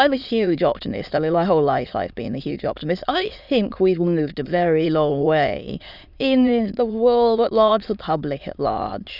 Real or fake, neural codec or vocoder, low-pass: fake; autoencoder, 22.05 kHz, a latent of 192 numbers a frame, VITS, trained on many speakers; 5.4 kHz